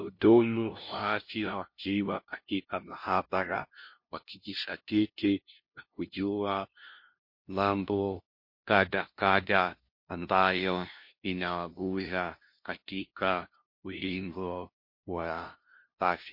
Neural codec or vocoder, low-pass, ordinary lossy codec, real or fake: codec, 16 kHz, 0.5 kbps, FunCodec, trained on LibriTTS, 25 frames a second; 5.4 kHz; MP3, 32 kbps; fake